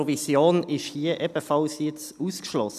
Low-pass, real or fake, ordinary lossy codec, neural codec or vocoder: 14.4 kHz; real; none; none